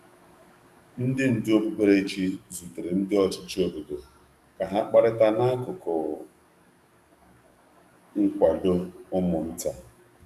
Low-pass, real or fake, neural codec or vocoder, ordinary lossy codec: 14.4 kHz; fake; codec, 44.1 kHz, 7.8 kbps, DAC; none